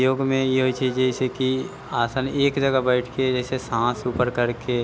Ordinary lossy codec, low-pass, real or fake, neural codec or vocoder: none; none; real; none